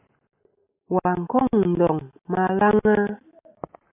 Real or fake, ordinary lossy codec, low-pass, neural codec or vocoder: real; AAC, 32 kbps; 3.6 kHz; none